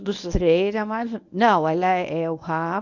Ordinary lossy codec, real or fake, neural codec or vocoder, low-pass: none; fake; codec, 24 kHz, 0.9 kbps, WavTokenizer, medium speech release version 1; 7.2 kHz